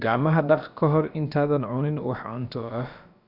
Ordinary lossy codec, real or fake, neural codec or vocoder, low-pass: none; fake; codec, 16 kHz, about 1 kbps, DyCAST, with the encoder's durations; 5.4 kHz